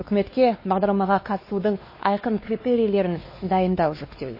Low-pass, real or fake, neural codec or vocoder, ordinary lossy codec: 5.4 kHz; fake; codec, 16 kHz, 2 kbps, X-Codec, WavLM features, trained on Multilingual LibriSpeech; MP3, 24 kbps